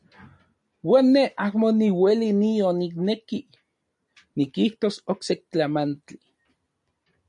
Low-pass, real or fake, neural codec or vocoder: 10.8 kHz; real; none